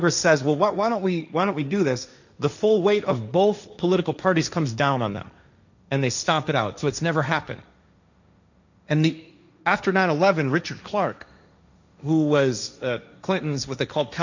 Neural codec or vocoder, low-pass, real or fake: codec, 16 kHz, 1.1 kbps, Voila-Tokenizer; 7.2 kHz; fake